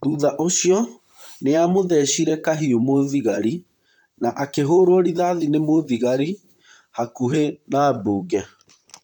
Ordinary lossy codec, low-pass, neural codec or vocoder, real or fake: none; 19.8 kHz; vocoder, 44.1 kHz, 128 mel bands, Pupu-Vocoder; fake